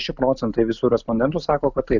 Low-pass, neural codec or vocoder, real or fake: 7.2 kHz; none; real